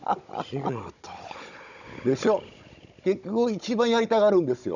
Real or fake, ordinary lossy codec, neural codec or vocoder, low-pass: fake; none; codec, 16 kHz, 16 kbps, FunCodec, trained on Chinese and English, 50 frames a second; 7.2 kHz